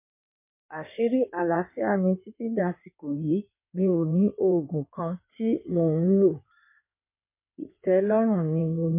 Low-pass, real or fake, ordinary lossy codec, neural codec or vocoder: 3.6 kHz; fake; MP3, 24 kbps; codec, 16 kHz in and 24 kHz out, 2.2 kbps, FireRedTTS-2 codec